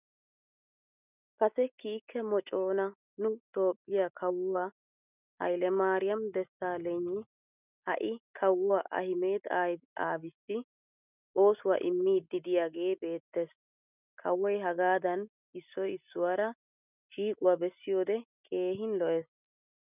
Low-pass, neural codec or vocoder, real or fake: 3.6 kHz; none; real